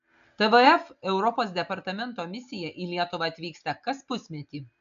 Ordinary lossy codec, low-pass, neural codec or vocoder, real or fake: AAC, 64 kbps; 7.2 kHz; none; real